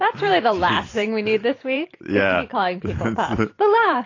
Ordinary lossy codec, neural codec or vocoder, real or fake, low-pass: AAC, 32 kbps; vocoder, 44.1 kHz, 128 mel bands every 512 samples, BigVGAN v2; fake; 7.2 kHz